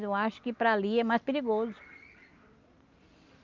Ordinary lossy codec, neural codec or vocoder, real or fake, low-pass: Opus, 24 kbps; none; real; 7.2 kHz